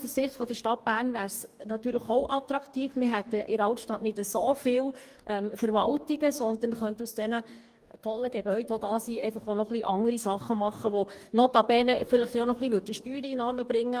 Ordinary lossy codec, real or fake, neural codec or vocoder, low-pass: Opus, 32 kbps; fake; codec, 44.1 kHz, 2.6 kbps, DAC; 14.4 kHz